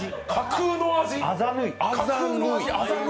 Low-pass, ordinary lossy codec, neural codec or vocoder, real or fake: none; none; none; real